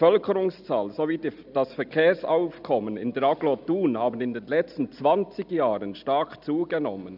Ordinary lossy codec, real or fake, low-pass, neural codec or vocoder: none; real; 5.4 kHz; none